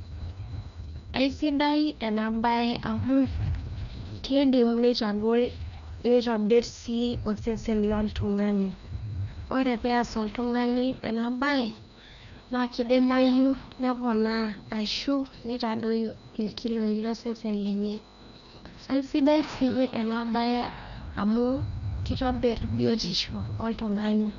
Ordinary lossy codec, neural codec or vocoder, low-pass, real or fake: none; codec, 16 kHz, 1 kbps, FreqCodec, larger model; 7.2 kHz; fake